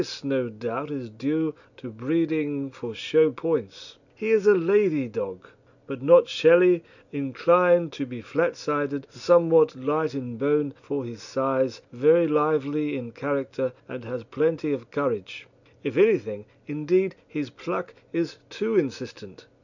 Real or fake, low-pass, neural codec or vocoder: real; 7.2 kHz; none